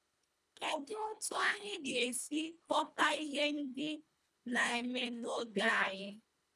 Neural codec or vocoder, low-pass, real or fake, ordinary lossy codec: codec, 24 kHz, 1.5 kbps, HILCodec; none; fake; none